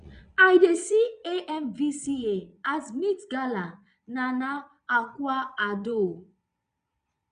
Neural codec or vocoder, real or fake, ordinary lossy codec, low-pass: vocoder, 22.05 kHz, 80 mel bands, Vocos; fake; none; 9.9 kHz